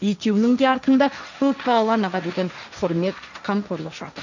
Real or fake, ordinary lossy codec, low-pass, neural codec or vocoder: fake; none; 7.2 kHz; codec, 16 kHz, 1.1 kbps, Voila-Tokenizer